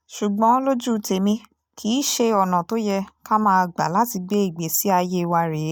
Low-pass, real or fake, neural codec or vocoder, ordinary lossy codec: none; real; none; none